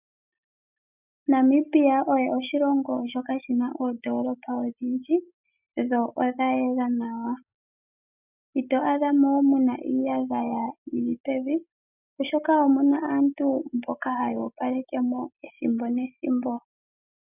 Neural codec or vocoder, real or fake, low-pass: none; real; 3.6 kHz